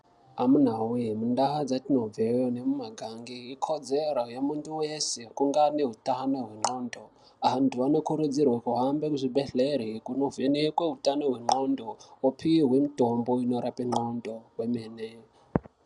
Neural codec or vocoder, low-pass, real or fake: none; 10.8 kHz; real